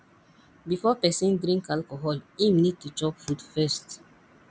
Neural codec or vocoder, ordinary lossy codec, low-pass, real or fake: none; none; none; real